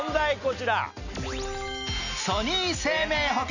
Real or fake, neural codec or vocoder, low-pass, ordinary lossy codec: real; none; 7.2 kHz; none